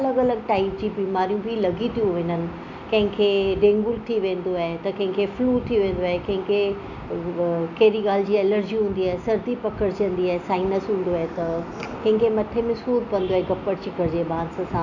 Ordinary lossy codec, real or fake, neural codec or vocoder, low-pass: none; real; none; 7.2 kHz